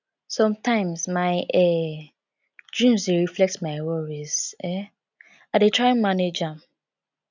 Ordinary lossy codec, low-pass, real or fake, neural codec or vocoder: none; 7.2 kHz; real; none